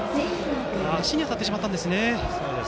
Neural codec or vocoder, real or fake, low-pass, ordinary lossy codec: none; real; none; none